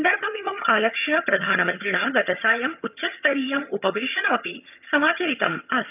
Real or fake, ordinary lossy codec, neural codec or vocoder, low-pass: fake; none; vocoder, 22.05 kHz, 80 mel bands, HiFi-GAN; 3.6 kHz